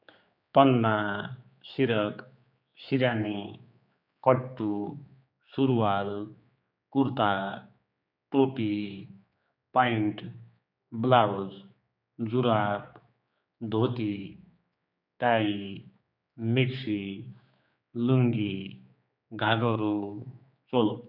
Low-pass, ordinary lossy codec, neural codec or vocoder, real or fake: 5.4 kHz; none; codec, 16 kHz, 4 kbps, X-Codec, HuBERT features, trained on general audio; fake